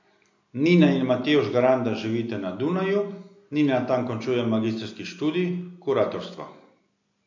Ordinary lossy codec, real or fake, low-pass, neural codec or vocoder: MP3, 48 kbps; real; 7.2 kHz; none